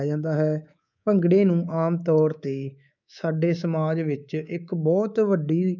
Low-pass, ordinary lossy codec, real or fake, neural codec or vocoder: 7.2 kHz; none; fake; codec, 24 kHz, 3.1 kbps, DualCodec